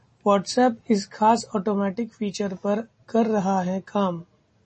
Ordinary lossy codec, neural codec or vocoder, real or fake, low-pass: MP3, 32 kbps; none; real; 10.8 kHz